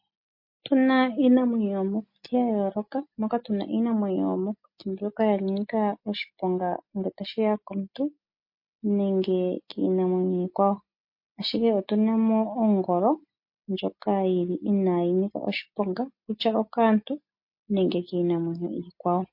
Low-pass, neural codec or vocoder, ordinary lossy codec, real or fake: 5.4 kHz; none; MP3, 32 kbps; real